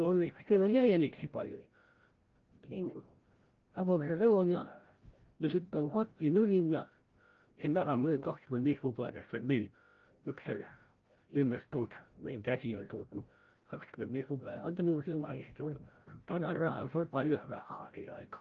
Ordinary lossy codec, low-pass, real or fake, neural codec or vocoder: Opus, 16 kbps; 7.2 kHz; fake; codec, 16 kHz, 0.5 kbps, FreqCodec, larger model